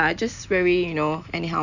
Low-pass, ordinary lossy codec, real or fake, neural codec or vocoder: 7.2 kHz; none; real; none